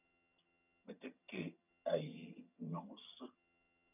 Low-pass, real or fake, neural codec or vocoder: 3.6 kHz; fake; vocoder, 22.05 kHz, 80 mel bands, HiFi-GAN